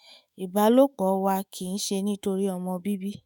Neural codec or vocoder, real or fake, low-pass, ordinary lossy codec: autoencoder, 48 kHz, 128 numbers a frame, DAC-VAE, trained on Japanese speech; fake; none; none